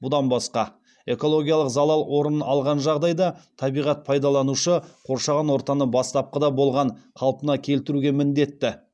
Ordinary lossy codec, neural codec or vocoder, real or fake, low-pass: none; none; real; none